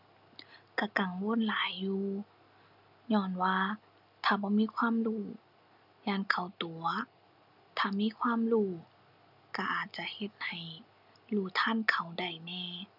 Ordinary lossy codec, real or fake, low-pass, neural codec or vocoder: none; real; 5.4 kHz; none